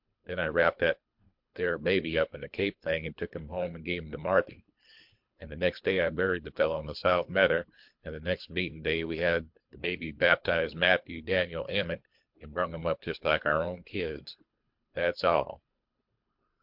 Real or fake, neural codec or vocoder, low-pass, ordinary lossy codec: fake; codec, 24 kHz, 3 kbps, HILCodec; 5.4 kHz; MP3, 48 kbps